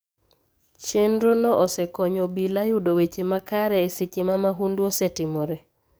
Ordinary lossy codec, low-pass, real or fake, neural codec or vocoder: none; none; fake; codec, 44.1 kHz, 7.8 kbps, DAC